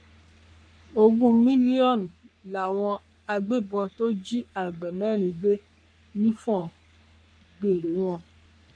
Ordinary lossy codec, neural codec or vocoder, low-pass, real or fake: none; codec, 44.1 kHz, 3.4 kbps, Pupu-Codec; 9.9 kHz; fake